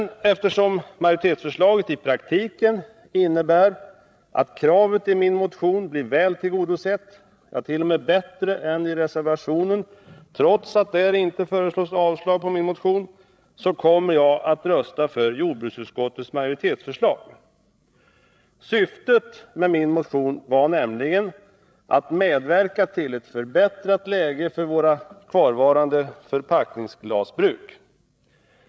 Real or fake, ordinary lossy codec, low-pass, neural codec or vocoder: fake; none; none; codec, 16 kHz, 16 kbps, FreqCodec, larger model